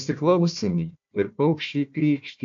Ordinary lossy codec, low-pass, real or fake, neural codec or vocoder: AAC, 64 kbps; 7.2 kHz; fake; codec, 16 kHz, 1 kbps, FunCodec, trained on Chinese and English, 50 frames a second